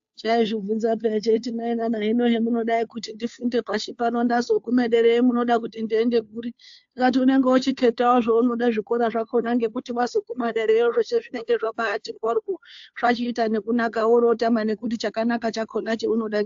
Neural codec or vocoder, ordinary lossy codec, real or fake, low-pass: codec, 16 kHz, 2 kbps, FunCodec, trained on Chinese and English, 25 frames a second; AAC, 64 kbps; fake; 7.2 kHz